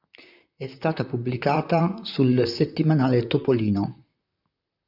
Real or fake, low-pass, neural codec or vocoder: fake; 5.4 kHz; vocoder, 44.1 kHz, 128 mel bands, Pupu-Vocoder